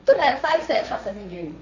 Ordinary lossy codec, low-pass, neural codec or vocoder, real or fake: none; 7.2 kHz; codec, 16 kHz, 1.1 kbps, Voila-Tokenizer; fake